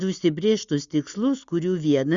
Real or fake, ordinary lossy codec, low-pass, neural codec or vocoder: real; Opus, 64 kbps; 7.2 kHz; none